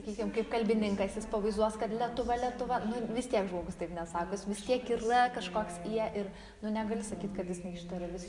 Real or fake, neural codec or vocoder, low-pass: real; none; 10.8 kHz